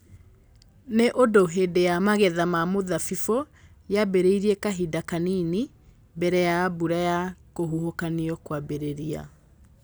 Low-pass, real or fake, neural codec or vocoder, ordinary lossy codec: none; real; none; none